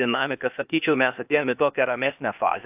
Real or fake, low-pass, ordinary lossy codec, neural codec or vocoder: fake; 3.6 kHz; AAC, 32 kbps; codec, 16 kHz, 0.8 kbps, ZipCodec